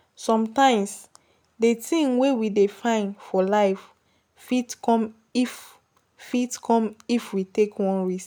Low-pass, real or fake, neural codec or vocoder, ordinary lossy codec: none; real; none; none